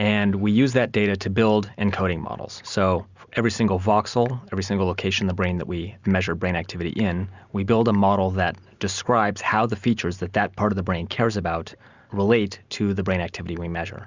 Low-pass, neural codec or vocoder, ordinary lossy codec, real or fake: 7.2 kHz; none; Opus, 64 kbps; real